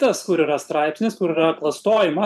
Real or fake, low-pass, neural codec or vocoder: fake; 14.4 kHz; vocoder, 44.1 kHz, 128 mel bands every 512 samples, BigVGAN v2